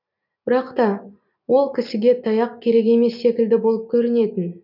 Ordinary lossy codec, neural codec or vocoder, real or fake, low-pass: none; none; real; 5.4 kHz